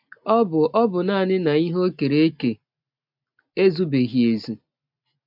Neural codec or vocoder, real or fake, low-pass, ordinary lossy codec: none; real; 5.4 kHz; MP3, 48 kbps